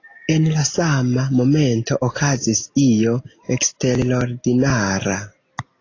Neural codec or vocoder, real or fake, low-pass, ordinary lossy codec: none; real; 7.2 kHz; AAC, 32 kbps